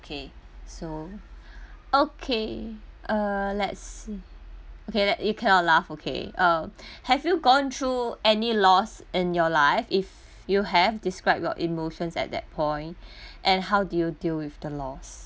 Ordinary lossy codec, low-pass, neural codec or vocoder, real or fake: none; none; none; real